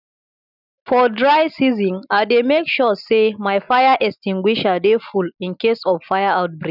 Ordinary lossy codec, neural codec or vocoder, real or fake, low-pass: none; none; real; 5.4 kHz